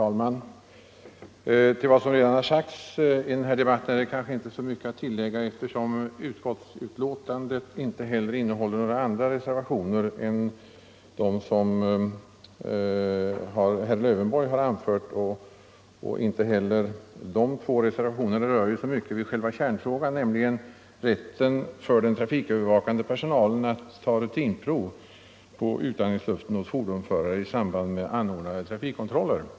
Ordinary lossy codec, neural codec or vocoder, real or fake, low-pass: none; none; real; none